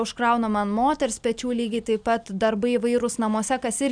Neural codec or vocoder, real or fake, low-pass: none; real; 9.9 kHz